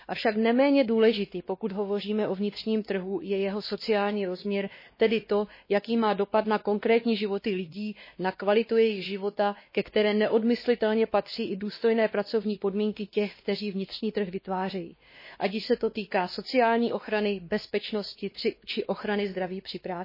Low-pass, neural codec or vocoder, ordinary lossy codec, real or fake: 5.4 kHz; codec, 16 kHz, 2 kbps, X-Codec, WavLM features, trained on Multilingual LibriSpeech; MP3, 24 kbps; fake